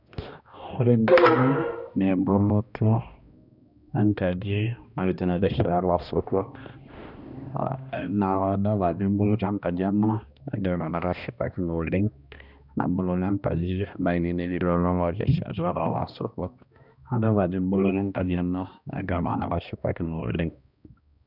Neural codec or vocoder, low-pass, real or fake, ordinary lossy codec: codec, 16 kHz, 1 kbps, X-Codec, HuBERT features, trained on balanced general audio; 5.4 kHz; fake; none